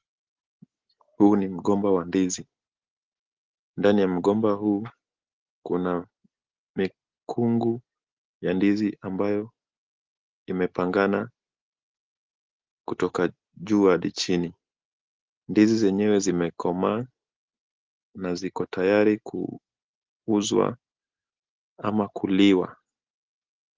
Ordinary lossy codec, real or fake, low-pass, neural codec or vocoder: Opus, 16 kbps; real; 7.2 kHz; none